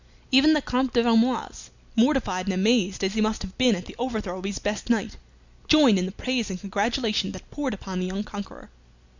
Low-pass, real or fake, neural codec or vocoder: 7.2 kHz; real; none